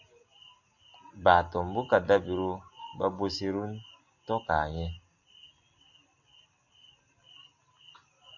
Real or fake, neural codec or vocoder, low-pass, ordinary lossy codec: real; none; 7.2 kHz; MP3, 48 kbps